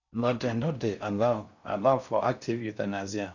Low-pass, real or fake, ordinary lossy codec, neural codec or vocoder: 7.2 kHz; fake; none; codec, 16 kHz in and 24 kHz out, 0.6 kbps, FocalCodec, streaming, 4096 codes